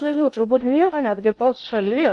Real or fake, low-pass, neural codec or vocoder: fake; 10.8 kHz; codec, 16 kHz in and 24 kHz out, 0.6 kbps, FocalCodec, streaming, 2048 codes